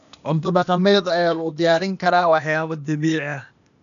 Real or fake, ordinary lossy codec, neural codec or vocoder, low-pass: fake; none; codec, 16 kHz, 0.8 kbps, ZipCodec; 7.2 kHz